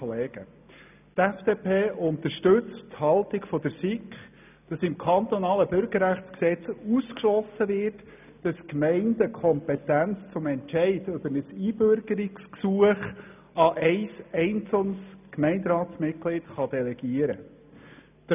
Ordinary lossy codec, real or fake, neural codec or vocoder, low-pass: none; real; none; 3.6 kHz